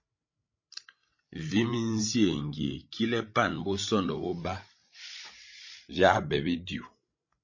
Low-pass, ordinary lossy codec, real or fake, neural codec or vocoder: 7.2 kHz; MP3, 32 kbps; fake; codec, 16 kHz, 16 kbps, FreqCodec, larger model